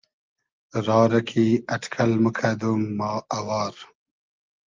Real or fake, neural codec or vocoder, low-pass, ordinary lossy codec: real; none; 7.2 kHz; Opus, 32 kbps